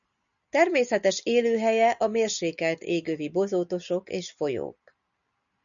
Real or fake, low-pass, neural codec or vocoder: real; 7.2 kHz; none